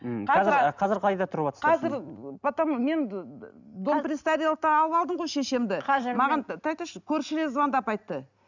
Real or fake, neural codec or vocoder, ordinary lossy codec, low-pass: real; none; none; 7.2 kHz